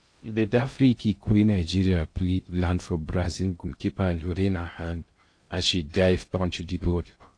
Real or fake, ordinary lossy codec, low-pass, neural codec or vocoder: fake; AAC, 48 kbps; 9.9 kHz; codec, 16 kHz in and 24 kHz out, 0.6 kbps, FocalCodec, streaming, 2048 codes